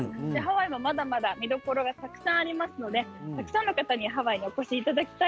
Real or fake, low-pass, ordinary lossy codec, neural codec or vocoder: real; none; none; none